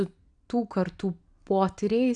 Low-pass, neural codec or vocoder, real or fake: 9.9 kHz; none; real